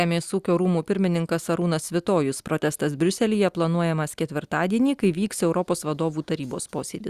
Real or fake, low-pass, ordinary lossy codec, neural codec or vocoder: real; 14.4 kHz; Opus, 64 kbps; none